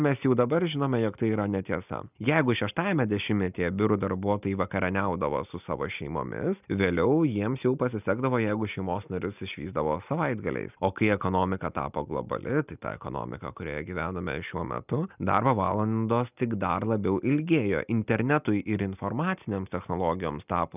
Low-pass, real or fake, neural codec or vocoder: 3.6 kHz; real; none